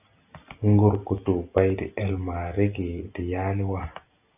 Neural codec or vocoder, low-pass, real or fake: none; 3.6 kHz; real